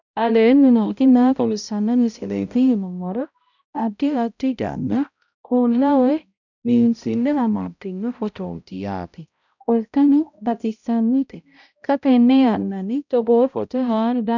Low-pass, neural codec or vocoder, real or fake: 7.2 kHz; codec, 16 kHz, 0.5 kbps, X-Codec, HuBERT features, trained on balanced general audio; fake